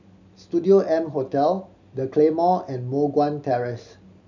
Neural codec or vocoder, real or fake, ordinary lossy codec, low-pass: none; real; none; 7.2 kHz